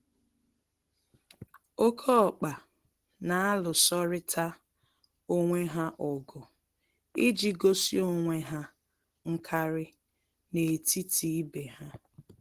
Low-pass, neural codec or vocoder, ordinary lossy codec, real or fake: 14.4 kHz; none; Opus, 16 kbps; real